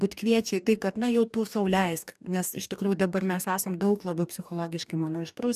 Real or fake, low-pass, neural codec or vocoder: fake; 14.4 kHz; codec, 44.1 kHz, 2.6 kbps, DAC